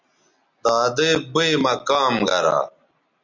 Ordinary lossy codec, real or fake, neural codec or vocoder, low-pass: MP3, 64 kbps; real; none; 7.2 kHz